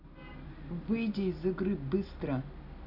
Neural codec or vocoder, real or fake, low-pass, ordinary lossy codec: none; real; 5.4 kHz; none